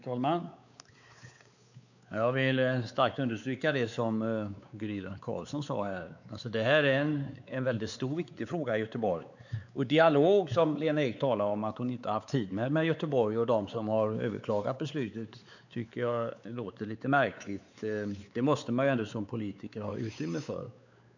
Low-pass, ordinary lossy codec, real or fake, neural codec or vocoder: 7.2 kHz; none; fake; codec, 16 kHz, 4 kbps, X-Codec, WavLM features, trained on Multilingual LibriSpeech